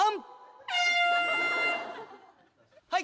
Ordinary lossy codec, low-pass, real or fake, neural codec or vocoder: none; none; real; none